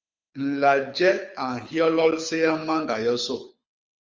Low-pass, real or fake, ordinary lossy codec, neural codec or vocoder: 7.2 kHz; fake; Opus, 32 kbps; codec, 24 kHz, 6 kbps, HILCodec